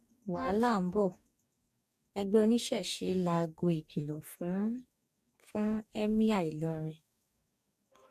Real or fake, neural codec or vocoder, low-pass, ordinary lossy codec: fake; codec, 44.1 kHz, 2.6 kbps, DAC; 14.4 kHz; AAC, 96 kbps